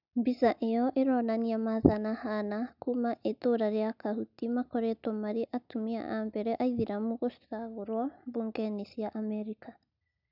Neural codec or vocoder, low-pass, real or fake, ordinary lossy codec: none; 5.4 kHz; real; none